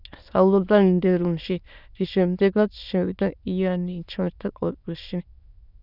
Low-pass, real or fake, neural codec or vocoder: 5.4 kHz; fake; autoencoder, 22.05 kHz, a latent of 192 numbers a frame, VITS, trained on many speakers